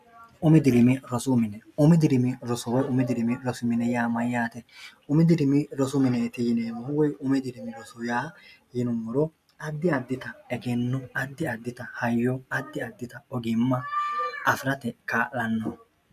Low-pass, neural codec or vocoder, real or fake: 14.4 kHz; none; real